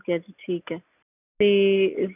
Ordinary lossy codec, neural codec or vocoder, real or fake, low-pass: none; none; real; 3.6 kHz